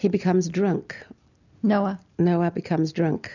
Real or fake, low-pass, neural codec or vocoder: real; 7.2 kHz; none